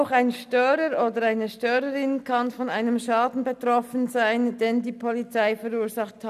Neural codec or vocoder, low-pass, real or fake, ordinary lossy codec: none; 14.4 kHz; real; none